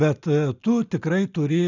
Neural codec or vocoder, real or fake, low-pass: none; real; 7.2 kHz